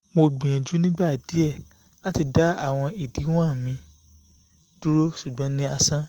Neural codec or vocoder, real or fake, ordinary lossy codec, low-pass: autoencoder, 48 kHz, 128 numbers a frame, DAC-VAE, trained on Japanese speech; fake; Opus, 24 kbps; 19.8 kHz